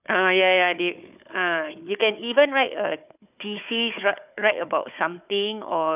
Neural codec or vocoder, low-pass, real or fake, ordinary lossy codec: codec, 16 kHz, 4 kbps, FunCodec, trained on Chinese and English, 50 frames a second; 3.6 kHz; fake; none